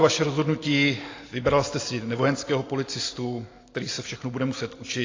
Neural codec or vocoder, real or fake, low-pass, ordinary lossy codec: none; real; 7.2 kHz; AAC, 32 kbps